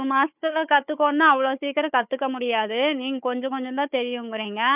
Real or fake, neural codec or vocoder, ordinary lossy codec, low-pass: fake; codec, 16 kHz, 4 kbps, FunCodec, trained on Chinese and English, 50 frames a second; none; 3.6 kHz